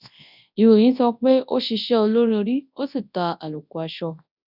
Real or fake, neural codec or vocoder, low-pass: fake; codec, 24 kHz, 0.9 kbps, WavTokenizer, large speech release; 5.4 kHz